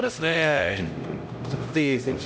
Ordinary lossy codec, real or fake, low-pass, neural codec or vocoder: none; fake; none; codec, 16 kHz, 0.5 kbps, X-Codec, HuBERT features, trained on LibriSpeech